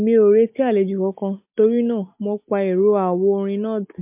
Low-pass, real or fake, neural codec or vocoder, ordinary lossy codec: 3.6 kHz; real; none; MP3, 32 kbps